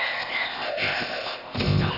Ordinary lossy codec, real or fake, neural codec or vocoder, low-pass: AAC, 48 kbps; fake; codec, 16 kHz, 0.8 kbps, ZipCodec; 5.4 kHz